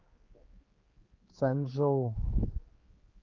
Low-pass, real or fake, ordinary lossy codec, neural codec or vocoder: 7.2 kHz; fake; Opus, 32 kbps; codec, 16 kHz, 2 kbps, X-Codec, HuBERT features, trained on general audio